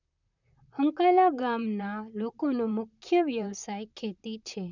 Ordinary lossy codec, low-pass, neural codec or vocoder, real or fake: none; 7.2 kHz; vocoder, 44.1 kHz, 128 mel bands, Pupu-Vocoder; fake